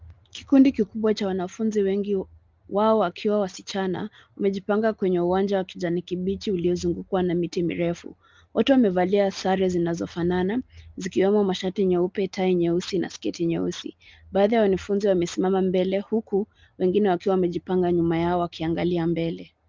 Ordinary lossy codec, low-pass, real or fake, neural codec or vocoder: Opus, 32 kbps; 7.2 kHz; real; none